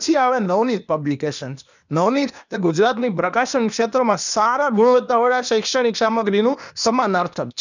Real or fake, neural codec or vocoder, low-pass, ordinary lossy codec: fake; codec, 16 kHz, 0.8 kbps, ZipCodec; 7.2 kHz; none